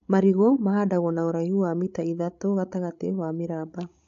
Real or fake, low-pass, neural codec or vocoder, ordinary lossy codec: fake; 7.2 kHz; codec, 16 kHz, 16 kbps, FreqCodec, larger model; AAC, 64 kbps